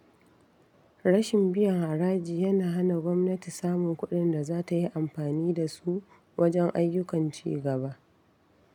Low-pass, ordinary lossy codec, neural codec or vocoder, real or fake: 19.8 kHz; none; none; real